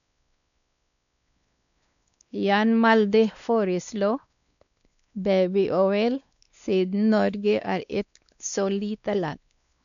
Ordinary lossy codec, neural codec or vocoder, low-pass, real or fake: MP3, 96 kbps; codec, 16 kHz, 2 kbps, X-Codec, WavLM features, trained on Multilingual LibriSpeech; 7.2 kHz; fake